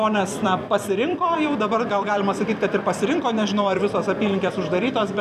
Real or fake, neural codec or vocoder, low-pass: fake; vocoder, 44.1 kHz, 128 mel bands every 256 samples, BigVGAN v2; 14.4 kHz